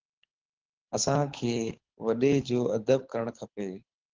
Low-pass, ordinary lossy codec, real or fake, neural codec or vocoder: 7.2 kHz; Opus, 16 kbps; fake; codec, 24 kHz, 6 kbps, HILCodec